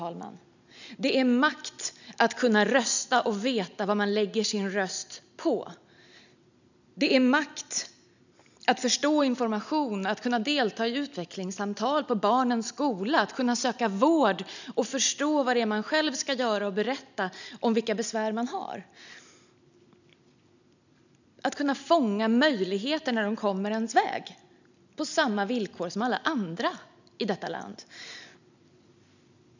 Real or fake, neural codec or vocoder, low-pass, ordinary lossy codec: real; none; 7.2 kHz; none